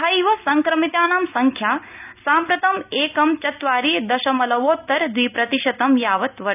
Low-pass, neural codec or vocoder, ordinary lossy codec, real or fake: 3.6 kHz; none; none; real